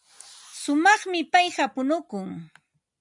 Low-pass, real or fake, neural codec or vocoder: 10.8 kHz; real; none